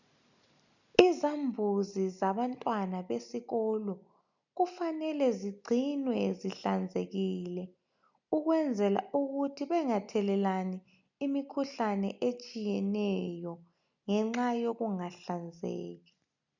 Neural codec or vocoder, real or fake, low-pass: none; real; 7.2 kHz